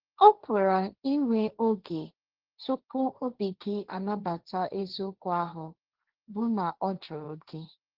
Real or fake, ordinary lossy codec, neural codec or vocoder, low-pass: fake; Opus, 16 kbps; codec, 16 kHz, 1.1 kbps, Voila-Tokenizer; 5.4 kHz